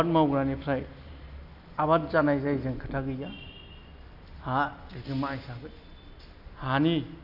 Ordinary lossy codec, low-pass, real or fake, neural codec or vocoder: none; 5.4 kHz; real; none